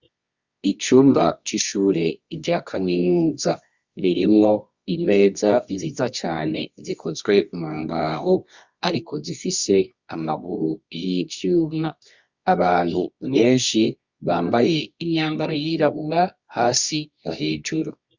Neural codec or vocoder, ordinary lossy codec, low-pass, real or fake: codec, 24 kHz, 0.9 kbps, WavTokenizer, medium music audio release; Opus, 64 kbps; 7.2 kHz; fake